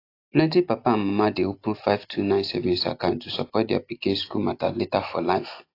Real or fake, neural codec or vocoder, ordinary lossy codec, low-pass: real; none; AAC, 32 kbps; 5.4 kHz